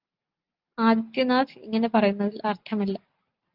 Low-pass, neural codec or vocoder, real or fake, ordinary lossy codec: 5.4 kHz; none; real; Opus, 32 kbps